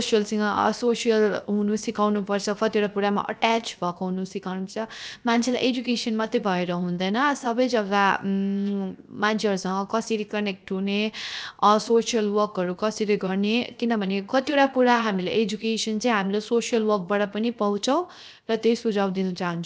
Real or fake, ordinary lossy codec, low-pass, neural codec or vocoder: fake; none; none; codec, 16 kHz, 0.7 kbps, FocalCodec